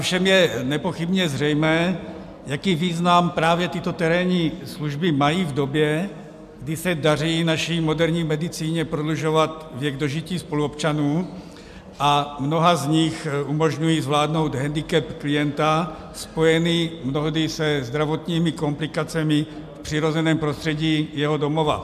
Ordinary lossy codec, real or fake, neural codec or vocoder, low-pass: MP3, 96 kbps; real; none; 14.4 kHz